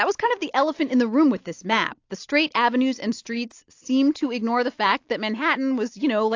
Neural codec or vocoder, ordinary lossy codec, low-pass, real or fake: none; AAC, 48 kbps; 7.2 kHz; real